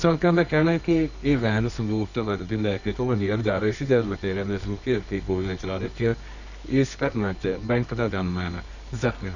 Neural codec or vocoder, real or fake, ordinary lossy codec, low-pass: codec, 24 kHz, 0.9 kbps, WavTokenizer, medium music audio release; fake; none; 7.2 kHz